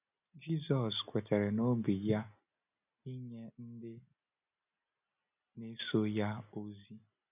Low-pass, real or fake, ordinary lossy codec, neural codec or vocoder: 3.6 kHz; real; none; none